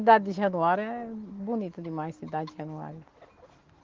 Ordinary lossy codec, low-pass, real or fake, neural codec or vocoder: Opus, 16 kbps; 7.2 kHz; real; none